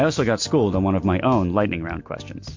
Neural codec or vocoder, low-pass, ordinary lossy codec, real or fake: none; 7.2 kHz; MP3, 48 kbps; real